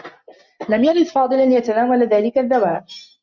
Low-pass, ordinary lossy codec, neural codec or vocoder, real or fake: 7.2 kHz; Opus, 64 kbps; none; real